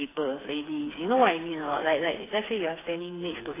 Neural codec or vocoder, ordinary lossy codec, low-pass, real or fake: codec, 16 kHz, 4 kbps, FreqCodec, smaller model; AAC, 16 kbps; 3.6 kHz; fake